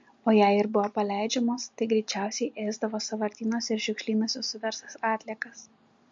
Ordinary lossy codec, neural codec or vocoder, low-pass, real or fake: MP3, 48 kbps; none; 7.2 kHz; real